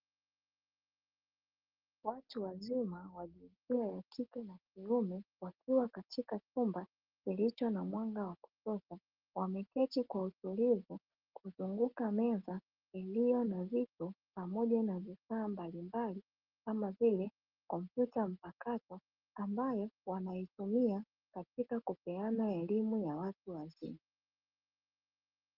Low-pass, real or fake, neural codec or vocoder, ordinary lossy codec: 5.4 kHz; real; none; Opus, 16 kbps